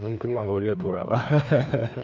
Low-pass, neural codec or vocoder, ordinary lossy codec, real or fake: none; codec, 16 kHz, 2 kbps, FreqCodec, larger model; none; fake